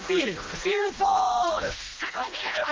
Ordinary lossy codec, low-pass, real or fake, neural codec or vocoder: Opus, 32 kbps; 7.2 kHz; fake; codec, 16 kHz, 1 kbps, FreqCodec, smaller model